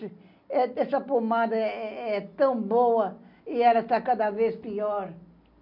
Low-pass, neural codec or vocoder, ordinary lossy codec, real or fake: 5.4 kHz; none; none; real